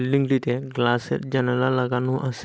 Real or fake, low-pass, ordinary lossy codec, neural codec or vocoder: real; none; none; none